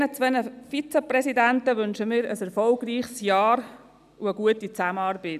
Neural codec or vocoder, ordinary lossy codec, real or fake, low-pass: none; none; real; 14.4 kHz